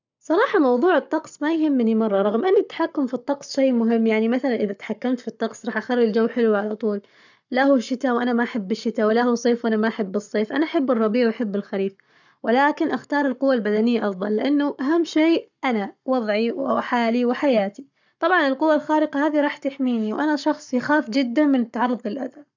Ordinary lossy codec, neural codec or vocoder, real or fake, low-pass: none; codec, 44.1 kHz, 7.8 kbps, Pupu-Codec; fake; 7.2 kHz